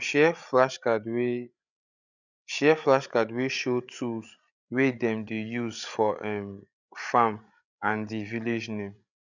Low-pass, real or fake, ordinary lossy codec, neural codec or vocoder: 7.2 kHz; fake; none; codec, 16 kHz, 8 kbps, FreqCodec, larger model